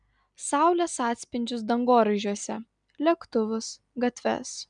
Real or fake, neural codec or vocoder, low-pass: real; none; 9.9 kHz